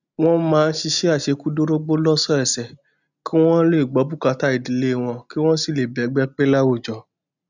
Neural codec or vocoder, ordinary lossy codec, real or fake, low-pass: none; none; real; 7.2 kHz